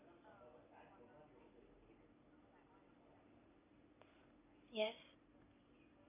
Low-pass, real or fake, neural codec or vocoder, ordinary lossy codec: 3.6 kHz; real; none; none